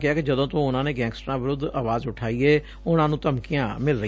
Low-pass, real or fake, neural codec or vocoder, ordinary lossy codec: none; real; none; none